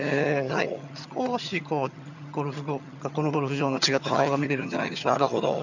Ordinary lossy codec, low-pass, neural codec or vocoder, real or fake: none; 7.2 kHz; vocoder, 22.05 kHz, 80 mel bands, HiFi-GAN; fake